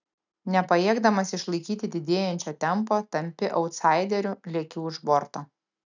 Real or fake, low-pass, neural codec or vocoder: real; 7.2 kHz; none